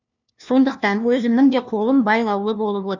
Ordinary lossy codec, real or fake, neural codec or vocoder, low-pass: none; fake; codec, 16 kHz, 1 kbps, FunCodec, trained on LibriTTS, 50 frames a second; 7.2 kHz